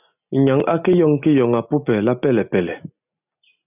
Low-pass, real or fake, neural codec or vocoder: 3.6 kHz; real; none